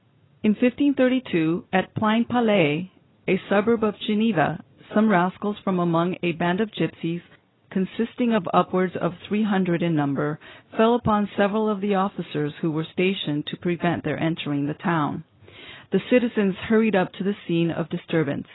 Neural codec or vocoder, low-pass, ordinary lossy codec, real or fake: none; 7.2 kHz; AAC, 16 kbps; real